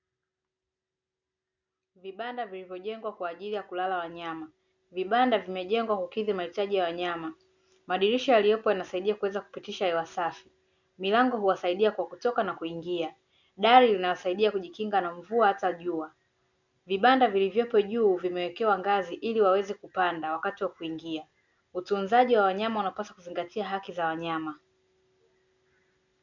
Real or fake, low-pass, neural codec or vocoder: real; 7.2 kHz; none